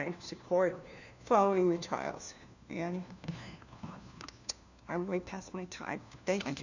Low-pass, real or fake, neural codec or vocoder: 7.2 kHz; fake; codec, 16 kHz, 1 kbps, FunCodec, trained on LibriTTS, 50 frames a second